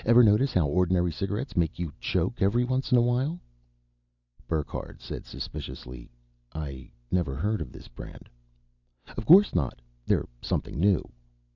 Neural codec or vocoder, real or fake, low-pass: none; real; 7.2 kHz